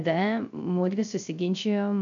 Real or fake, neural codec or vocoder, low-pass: fake; codec, 16 kHz, 0.3 kbps, FocalCodec; 7.2 kHz